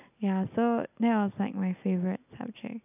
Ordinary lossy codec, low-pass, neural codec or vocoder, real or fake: none; 3.6 kHz; none; real